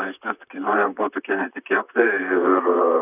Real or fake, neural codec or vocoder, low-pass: fake; vocoder, 44.1 kHz, 128 mel bands, Pupu-Vocoder; 3.6 kHz